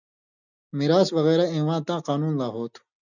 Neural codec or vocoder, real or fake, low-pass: none; real; 7.2 kHz